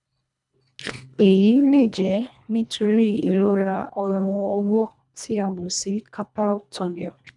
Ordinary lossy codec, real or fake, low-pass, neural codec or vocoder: none; fake; 10.8 kHz; codec, 24 kHz, 1.5 kbps, HILCodec